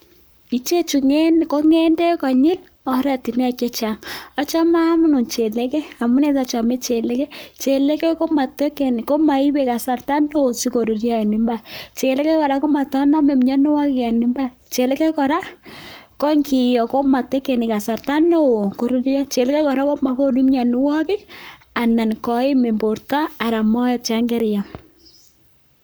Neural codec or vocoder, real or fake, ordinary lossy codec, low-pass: codec, 44.1 kHz, 7.8 kbps, Pupu-Codec; fake; none; none